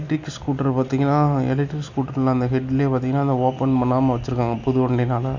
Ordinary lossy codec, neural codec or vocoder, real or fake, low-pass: none; none; real; 7.2 kHz